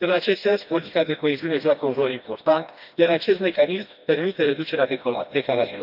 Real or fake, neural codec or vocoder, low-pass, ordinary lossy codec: fake; codec, 16 kHz, 1 kbps, FreqCodec, smaller model; 5.4 kHz; AAC, 48 kbps